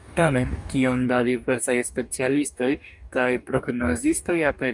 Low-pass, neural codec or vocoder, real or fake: 10.8 kHz; codec, 44.1 kHz, 2.6 kbps, DAC; fake